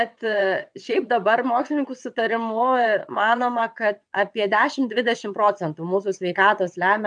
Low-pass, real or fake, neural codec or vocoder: 9.9 kHz; fake; vocoder, 22.05 kHz, 80 mel bands, WaveNeXt